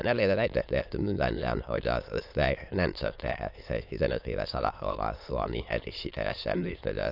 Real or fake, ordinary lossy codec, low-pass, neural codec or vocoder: fake; AAC, 48 kbps; 5.4 kHz; autoencoder, 22.05 kHz, a latent of 192 numbers a frame, VITS, trained on many speakers